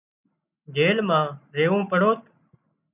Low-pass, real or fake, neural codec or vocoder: 3.6 kHz; real; none